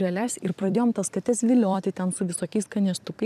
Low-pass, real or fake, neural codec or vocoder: 14.4 kHz; fake; vocoder, 44.1 kHz, 128 mel bands every 256 samples, BigVGAN v2